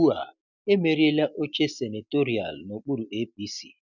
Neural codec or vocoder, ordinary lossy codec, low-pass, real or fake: none; none; 7.2 kHz; real